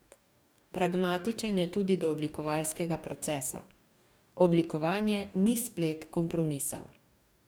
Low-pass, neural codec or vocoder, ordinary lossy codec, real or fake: none; codec, 44.1 kHz, 2.6 kbps, DAC; none; fake